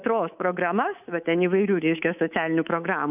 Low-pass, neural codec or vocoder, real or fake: 3.6 kHz; codec, 24 kHz, 3.1 kbps, DualCodec; fake